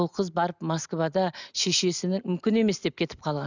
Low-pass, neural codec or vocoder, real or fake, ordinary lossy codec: 7.2 kHz; none; real; none